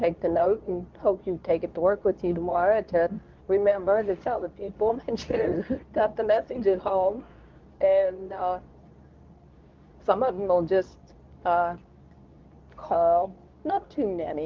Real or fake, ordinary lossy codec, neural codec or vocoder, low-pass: fake; Opus, 32 kbps; codec, 24 kHz, 0.9 kbps, WavTokenizer, medium speech release version 2; 7.2 kHz